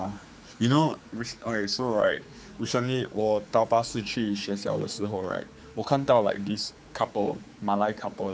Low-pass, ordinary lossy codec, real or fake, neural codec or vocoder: none; none; fake; codec, 16 kHz, 4 kbps, X-Codec, HuBERT features, trained on general audio